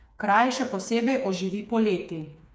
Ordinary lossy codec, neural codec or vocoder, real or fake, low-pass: none; codec, 16 kHz, 4 kbps, FreqCodec, smaller model; fake; none